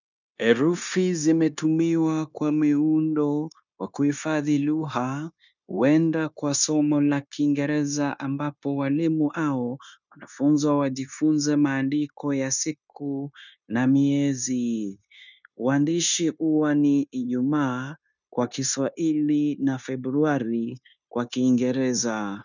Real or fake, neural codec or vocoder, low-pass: fake; codec, 16 kHz, 0.9 kbps, LongCat-Audio-Codec; 7.2 kHz